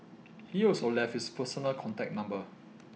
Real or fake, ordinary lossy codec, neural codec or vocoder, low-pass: real; none; none; none